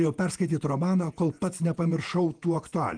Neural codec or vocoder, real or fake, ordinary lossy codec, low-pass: vocoder, 48 kHz, 128 mel bands, Vocos; fake; Opus, 24 kbps; 9.9 kHz